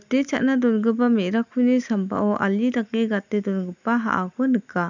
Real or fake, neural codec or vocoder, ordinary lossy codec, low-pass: real; none; none; 7.2 kHz